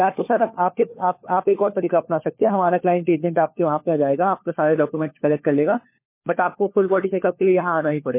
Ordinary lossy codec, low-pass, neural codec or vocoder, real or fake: MP3, 24 kbps; 3.6 kHz; codec, 16 kHz, 4 kbps, FunCodec, trained on LibriTTS, 50 frames a second; fake